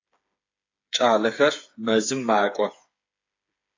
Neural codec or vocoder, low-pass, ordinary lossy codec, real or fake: codec, 16 kHz, 8 kbps, FreqCodec, smaller model; 7.2 kHz; AAC, 48 kbps; fake